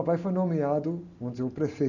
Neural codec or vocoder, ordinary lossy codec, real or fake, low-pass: none; none; real; 7.2 kHz